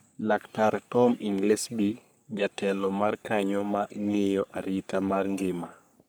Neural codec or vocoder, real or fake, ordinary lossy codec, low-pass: codec, 44.1 kHz, 3.4 kbps, Pupu-Codec; fake; none; none